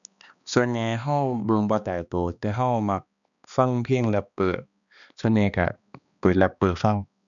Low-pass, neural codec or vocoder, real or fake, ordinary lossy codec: 7.2 kHz; codec, 16 kHz, 2 kbps, X-Codec, HuBERT features, trained on balanced general audio; fake; none